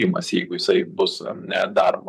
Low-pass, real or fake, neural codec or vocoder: 14.4 kHz; fake; vocoder, 44.1 kHz, 128 mel bands, Pupu-Vocoder